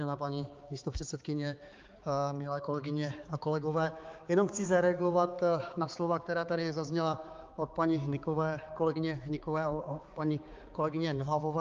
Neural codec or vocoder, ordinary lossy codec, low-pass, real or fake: codec, 16 kHz, 4 kbps, X-Codec, HuBERT features, trained on balanced general audio; Opus, 32 kbps; 7.2 kHz; fake